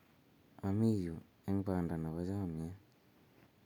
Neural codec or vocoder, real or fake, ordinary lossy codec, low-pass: none; real; none; 19.8 kHz